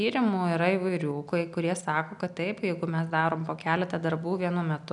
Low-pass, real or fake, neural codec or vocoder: 10.8 kHz; real; none